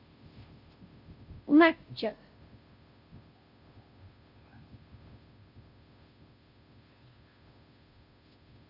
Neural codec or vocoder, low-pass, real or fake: codec, 16 kHz, 0.5 kbps, FunCodec, trained on Chinese and English, 25 frames a second; 5.4 kHz; fake